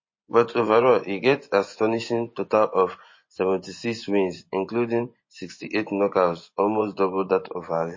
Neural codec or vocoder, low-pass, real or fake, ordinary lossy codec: vocoder, 24 kHz, 100 mel bands, Vocos; 7.2 kHz; fake; MP3, 32 kbps